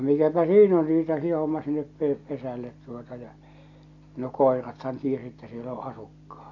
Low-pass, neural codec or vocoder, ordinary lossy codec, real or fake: 7.2 kHz; none; AAC, 48 kbps; real